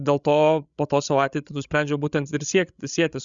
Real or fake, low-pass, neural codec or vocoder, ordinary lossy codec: fake; 7.2 kHz; codec, 16 kHz, 16 kbps, FreqCodec, larger model; Opus, 64 kbps